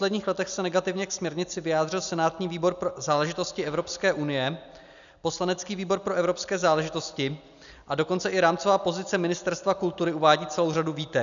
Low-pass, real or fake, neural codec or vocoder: 7.2 kHz; real; none